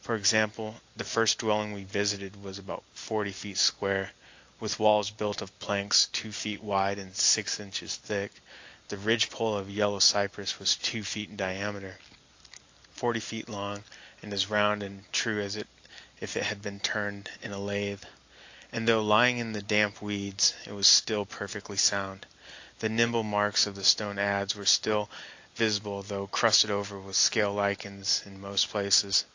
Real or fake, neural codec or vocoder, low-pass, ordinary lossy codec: real; none; 7.2 kHz; AAC, 48 kbps